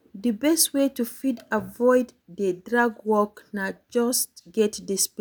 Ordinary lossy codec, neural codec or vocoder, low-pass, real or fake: none; none; none; real